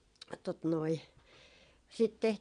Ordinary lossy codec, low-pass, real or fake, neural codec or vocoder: none; 9.9 kHz; fake; vocoder, 22.05 kHz, 80 mel bands, Vocos